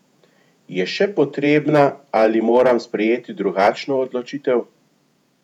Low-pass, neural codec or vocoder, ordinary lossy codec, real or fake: 19.8 kHz; vocoder, 48 kHz, 128 mel bands, Vocos; none; fake